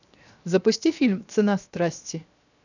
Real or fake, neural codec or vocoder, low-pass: fake; codec, 16 kHz, 0.7 kbps, FocalCodec; 7.2 kHz